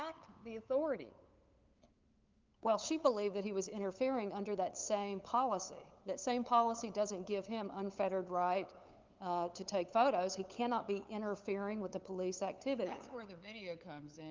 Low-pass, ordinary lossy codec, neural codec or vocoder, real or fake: 7.2 kHz; Opus, 24 kbps; codec, 16 kHz, 8 kbps, FunCodec, trained on LibriTTS, 25 frames a second; fake